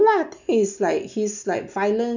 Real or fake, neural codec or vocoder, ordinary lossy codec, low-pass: real; none; none; 7.2 kHz